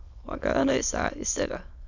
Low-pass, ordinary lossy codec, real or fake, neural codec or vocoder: 7.2 kHz; none; fake; autoencoder, 22.05 kHz, a latent of 192 numbers a frame, VITS, trained on many speakers